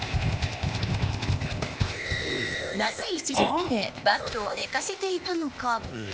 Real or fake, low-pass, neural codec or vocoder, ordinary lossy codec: fake; none; codec, 16 kHz, 0.8 kbps, ZipCodec; none